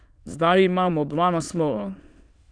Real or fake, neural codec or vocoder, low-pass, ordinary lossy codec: fake; autoencoder, 22.05 kHz, a latent of 192 numbers a frame, VITS, trained on many speakers; 9.9 kHz; none